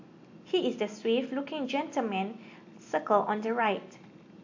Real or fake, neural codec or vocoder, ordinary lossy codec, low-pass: real; none; AAC, 48 kbps; 7.2 kHz